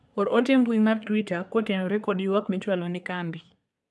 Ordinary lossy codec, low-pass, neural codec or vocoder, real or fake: none; none; codec, 24 kHz, 1 kbps, SNAC; fake